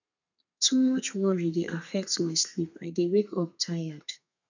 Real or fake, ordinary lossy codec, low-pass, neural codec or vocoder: fake; none; 7.2 kHz; codec, 32 kHz, 1.9 kbps, SNAC